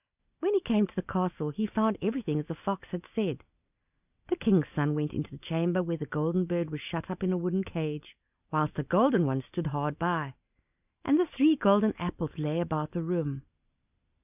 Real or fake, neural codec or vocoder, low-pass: real; none; 3.6 kHz